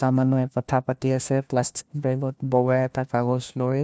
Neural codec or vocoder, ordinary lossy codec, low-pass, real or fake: codec, 16 kHz, 1 kbps, FunCodec, trained on LibriTTS, 50 frames a second; none; none; fake